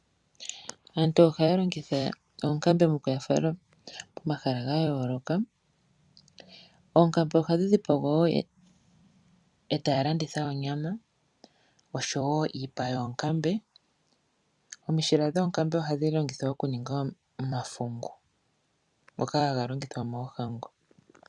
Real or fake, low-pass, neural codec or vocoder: fake; 10.8 kHz; vocoder, 44.1 kHz, 128 mel bands every 256 samples, BigVGAN v2